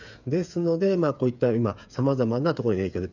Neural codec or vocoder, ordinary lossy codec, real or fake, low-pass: codec, 16 kHz, 8 kbps, FreqCodec, smaller model; none; fake; 7.2 kHz